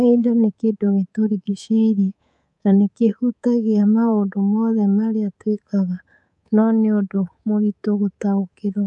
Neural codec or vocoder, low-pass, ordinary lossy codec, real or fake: codec, 24 kHz, 3.1 kbps, DualCodec; 10.8 kHz; none; fake